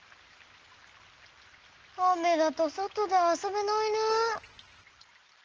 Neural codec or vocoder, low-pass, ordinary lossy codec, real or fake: none; 7.2 kHz; Opus, 16 kbps; real